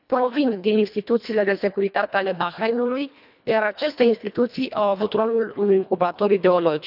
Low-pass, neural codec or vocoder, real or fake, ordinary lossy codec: 5.4 kHz; codec, 24 kHz, 1.5 kbps, HILCodec; fake; none